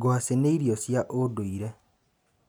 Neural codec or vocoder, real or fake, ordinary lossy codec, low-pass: none; real; none; none